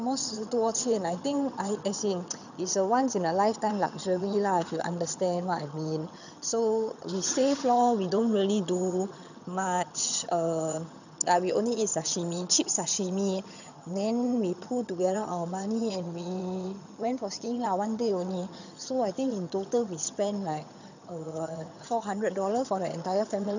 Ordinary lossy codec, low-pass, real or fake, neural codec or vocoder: none; 7.2 kHz; fake; vocoder, 22.05 kHz, 80 mel bands, HiFi-GAN